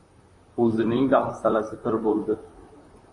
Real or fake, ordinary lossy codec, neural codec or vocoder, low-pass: fake; AAC, 32 kbps; vocoder, 44.1 kHz, 128 mel bands, Pupu-Vocoder; 10.8 kHz